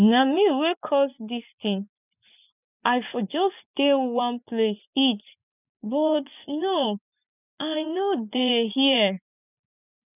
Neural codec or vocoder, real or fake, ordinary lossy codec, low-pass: vocoder, 24 kHz, 100 mel bands, Vocos; fake; none; 3.6 kHz